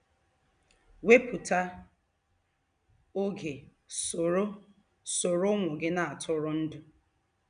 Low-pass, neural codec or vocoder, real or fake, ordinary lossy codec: 9.9 kHz; none; real; none